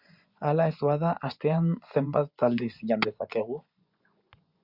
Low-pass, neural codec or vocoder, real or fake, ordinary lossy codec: 5.4 kHz; vocoder, 24 kHz, 100 mel bands, Vocos; fake; Opus, 64 kbps